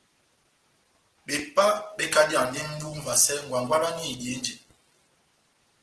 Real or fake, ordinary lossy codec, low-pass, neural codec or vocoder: real; Opus, 16 kbps; 10.8 kHz; none